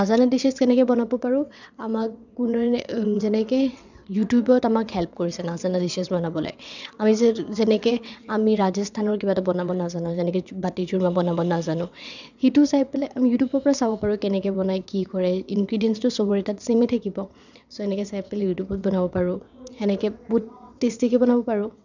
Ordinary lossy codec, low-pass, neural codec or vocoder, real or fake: none; 7.2 kHz; vocoder, 22.05 kHz, 80 mel bands, WaveNeXt; fake